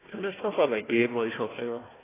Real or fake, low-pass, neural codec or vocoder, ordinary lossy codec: fake; 3.6 kHz; codec, 16 kHz, 1 kbps, FunCodec, trained on Chinese and English, 50 frames a second; AAC, 16 kbps